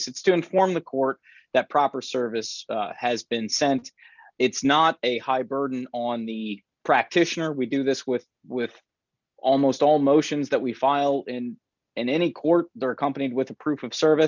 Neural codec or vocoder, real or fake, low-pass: none; real; 7.2 kHz